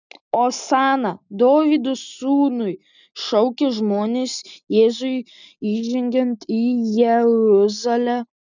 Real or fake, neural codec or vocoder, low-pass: real; none; 7.2 kHz